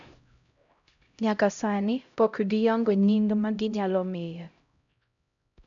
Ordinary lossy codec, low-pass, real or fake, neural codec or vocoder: none; 7.2 kHz; fake; codec, 16 kHz, 0.5 kbps, X-Codec, HuBERT features, trained on LibriSpeech